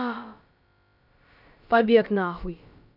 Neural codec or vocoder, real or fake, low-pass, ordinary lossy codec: codec, 16 kHz, about 1 kbps, DyCAST, with the encoder's durations; fake; 5.4 kHz; none